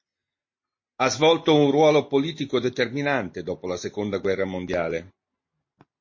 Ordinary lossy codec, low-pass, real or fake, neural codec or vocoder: MP3, 32 kbps; 7.2 kHz; real; none